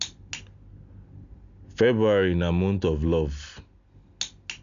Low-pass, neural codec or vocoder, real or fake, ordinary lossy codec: 7.2 kHz; none; real; MP3, 64 kbps